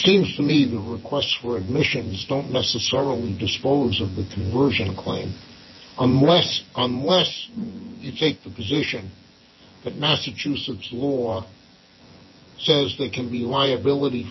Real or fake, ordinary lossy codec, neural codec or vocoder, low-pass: fake; MP3, 24 kbps; vocoder, 24 kHz, 100 mel bands, Vocos; 7.2 kHz